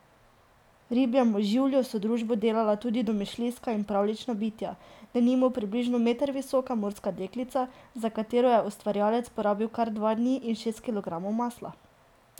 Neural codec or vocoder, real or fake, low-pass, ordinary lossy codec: none; real; 19.8 kHz; none